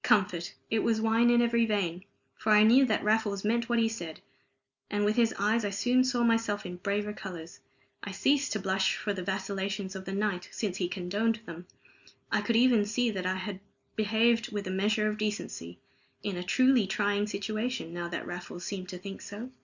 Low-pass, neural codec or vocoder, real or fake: 7.2 kHz; none; real